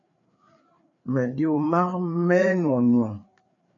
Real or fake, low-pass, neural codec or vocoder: fake; 7.2 kHz; codec, 16 kHz, 4 kbps, FreqCodec, larger model